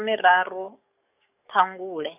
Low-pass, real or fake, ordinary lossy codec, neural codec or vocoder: 3.6 kHz; fake; none; codec, 44.1 kHz, 7.8 kbps, DAC